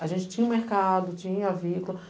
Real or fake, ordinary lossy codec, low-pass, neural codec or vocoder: real; none; none; none